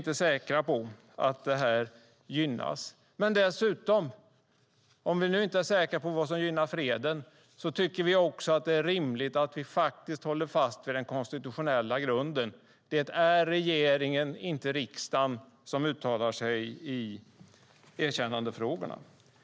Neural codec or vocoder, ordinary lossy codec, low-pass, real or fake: none; none; none; real